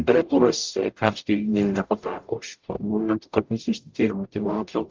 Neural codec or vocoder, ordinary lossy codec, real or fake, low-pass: codec, 44.1 kHz, 0.9 kbps, DAC; Opus, 24 kbps; fake; 7.2 kHz